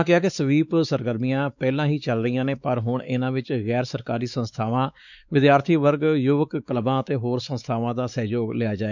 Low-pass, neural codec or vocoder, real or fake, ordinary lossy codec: 7.2 kHz; codec, 16 kHz, 4 kbps, X-Codec, WavLM features, trained on Multilingual LibriSpeech; fake; none